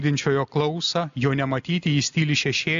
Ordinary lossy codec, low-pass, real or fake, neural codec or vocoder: AAC, 64 kbps; 7.2 kHz; real; none